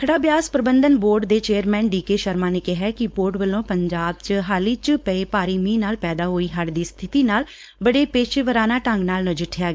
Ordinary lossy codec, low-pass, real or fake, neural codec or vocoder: none; none; fake; codec, 16 kHz, 4.8 kbps, FACodec